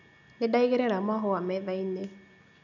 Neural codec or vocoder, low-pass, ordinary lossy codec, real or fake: none; 7.2 kHz; none; real